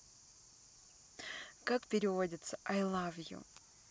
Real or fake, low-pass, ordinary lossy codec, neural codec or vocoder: real; none; none; none